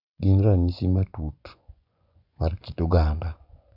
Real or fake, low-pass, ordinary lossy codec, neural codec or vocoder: fake; 5.4 kHz; none; vocoder, 24 kHz, 100 mel bands, Vocos